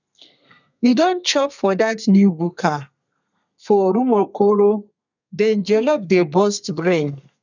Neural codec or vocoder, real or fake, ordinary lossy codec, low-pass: codec, 32 kHz, 1.9 kbps, SNAC; fake; none; 7.2 kHz